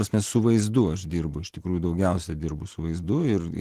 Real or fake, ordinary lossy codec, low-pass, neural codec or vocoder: real; Opus, 16 kbps; 14.4 kHz; none